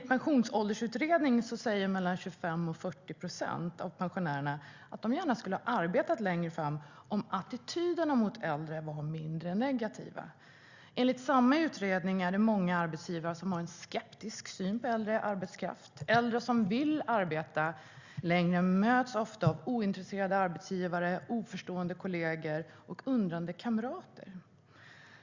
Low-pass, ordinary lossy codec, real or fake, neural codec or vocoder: 7.2 kHz; Opus, 64 kbps; real; none